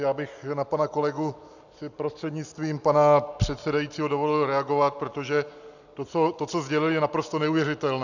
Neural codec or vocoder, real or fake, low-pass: none; real; 7.2 kHz